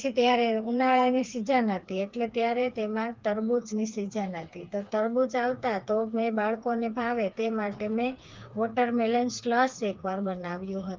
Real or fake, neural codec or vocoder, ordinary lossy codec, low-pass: fake; codec, 16 kHz, 4 kbps, FreqCodec, smaller model; Opus, 32 kbps; 7.2 kHz